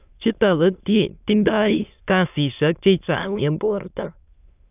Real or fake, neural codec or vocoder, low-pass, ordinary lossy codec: fake; autoencoder, 22.05 kHz, a latent of 192 numbers a frame, VITS, trained on many speakers; 3.6 kHz; none